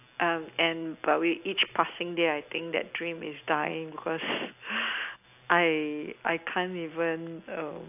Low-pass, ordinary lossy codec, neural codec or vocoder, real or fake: 3.6 kHz; AAC, 32 kbps; none; real